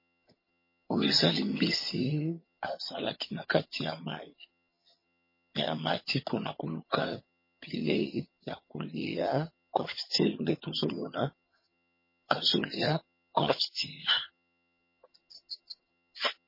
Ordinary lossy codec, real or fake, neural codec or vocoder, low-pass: MP3, 24 kbps; fake; vocoder, 22.05 kHz, 80 mel bands, HiFi-GAN; 5.4 kHz